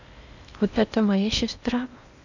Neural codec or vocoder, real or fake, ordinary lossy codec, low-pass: codec, 16 kHz in and 24 kHz out, 0.8 kbps, FocalCodec, streaming, 65536 codes; fake; none; 7.2 kHz